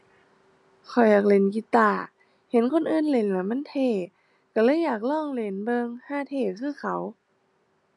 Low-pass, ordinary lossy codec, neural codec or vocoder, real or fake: 10.8 kHz; none; none; real